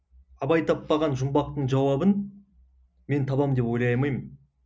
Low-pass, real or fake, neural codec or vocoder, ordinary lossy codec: none; real; none; none